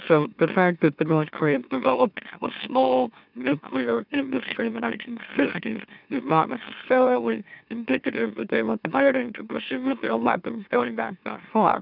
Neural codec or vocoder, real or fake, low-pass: autoencoder, 44.1 kHz, a latent of 192 numbers a frame, MeloTTS; fake; 5.4 kHz